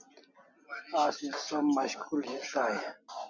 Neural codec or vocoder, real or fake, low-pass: none; real; 7.2 kHz